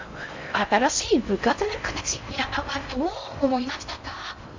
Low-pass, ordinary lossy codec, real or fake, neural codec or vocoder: 7.2 kHz; MP3, 64 kbps; fake; codec, 16 kHz in and 24 kHz out, 0.6 kbps, FocalCodec, streaming, 4096 codes